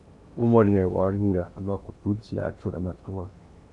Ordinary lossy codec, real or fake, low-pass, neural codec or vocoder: AAC, 64 kbps; fake; 10.8 kHz; codec, 16 kHz in and 24 kHz out, 0.8 kbps, FocalCodec, streaming, 65536 codes